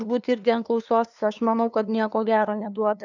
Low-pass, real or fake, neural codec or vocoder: 7.2 kHz; fake; codec, 16 kHz, 2 kbps, FunCodec, trained on LibriTTS, 25 frames a second